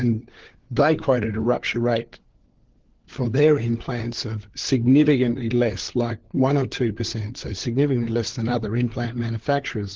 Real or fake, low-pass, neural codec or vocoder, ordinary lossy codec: fake; 7.2 kHz; codec, 16 kHz, 4 kbps, FunCodec, trained on LibriTTS, 50 frames a second; Opus, 16 kbps